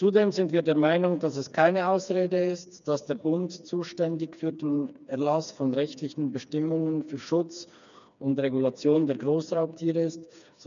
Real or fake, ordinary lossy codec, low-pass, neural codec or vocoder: fake; none; 7.2 kHz; codec, 16 kHz, 2 kbps, FreqCodec, smaller model